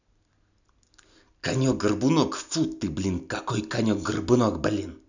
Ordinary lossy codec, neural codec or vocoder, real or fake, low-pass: none; none; real; 7.2 kHz